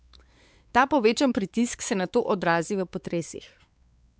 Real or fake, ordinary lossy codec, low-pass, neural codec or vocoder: fake; none; none; codec, 16 kHz, 4 kbps, X-Codec, WavLM features, trained on Multilingual LibriSpeech